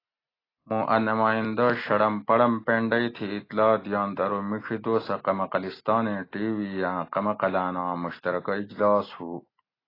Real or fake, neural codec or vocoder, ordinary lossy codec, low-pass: real; none; AAC, 24 kbps; 5.4 kHz